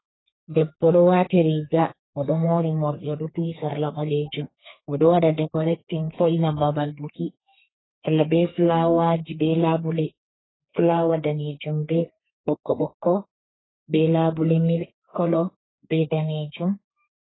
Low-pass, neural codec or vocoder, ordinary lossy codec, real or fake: 7.2 kHz; codec, 32 kHz, 1.9 kbps, SNAC; AAC, 16 kbps; fake